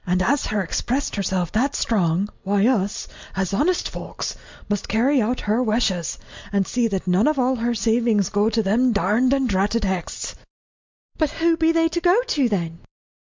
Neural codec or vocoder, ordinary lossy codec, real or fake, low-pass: none; MP3, 64 kbps; real; 7.2 kHz